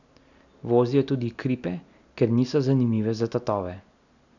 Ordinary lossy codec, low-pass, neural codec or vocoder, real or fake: none; 7.2 kHz; none; real